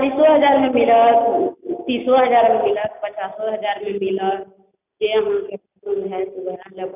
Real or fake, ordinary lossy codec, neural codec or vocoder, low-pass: real; none; none; 3.6 kHz